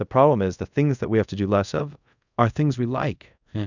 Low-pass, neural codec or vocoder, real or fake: 7.2 kHz; codec, 24 kHz, 0.5 kbps, DualCodec; fake